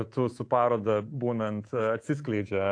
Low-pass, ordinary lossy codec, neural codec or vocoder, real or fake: 9.9 kHz; AAC, 48 kbps; codec, 24 kHz, 3.1 kbps, DualCodec; fake